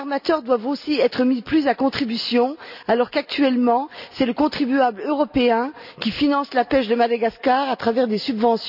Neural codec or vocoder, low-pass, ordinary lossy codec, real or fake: none; 5.4 kHz; none; real